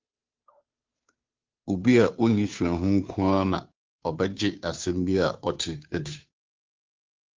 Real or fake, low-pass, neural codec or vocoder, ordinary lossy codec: fake; 7.2 kHz; codec, 16 kHz, 2 kbps, FunCodec, trained on Chinese and English, 25 frames a second; Opus, 24 kbps